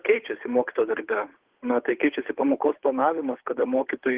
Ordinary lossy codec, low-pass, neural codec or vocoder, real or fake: Opus, 16 kbps; 3.6 kHz; codec, 16 kHz, 8 kbps, FunCodec, trained on Chinese and English, 25 frames a second; fake